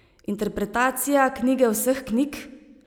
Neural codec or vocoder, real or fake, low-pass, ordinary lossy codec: none; real; none; none